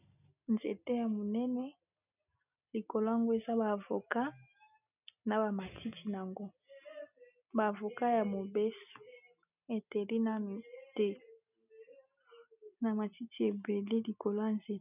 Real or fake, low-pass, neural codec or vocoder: real; 3.6 kHz; none